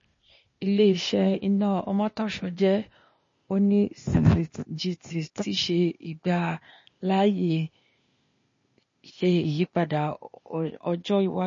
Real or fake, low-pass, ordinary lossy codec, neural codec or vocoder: fake; 7.2 kHz; MP3, 32 kbps; codec, 16 kHz, 0.8 kbps, ZipCodec